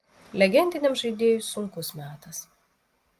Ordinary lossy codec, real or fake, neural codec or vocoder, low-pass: Opus, 32 kbps; real; none; 14.4 kHz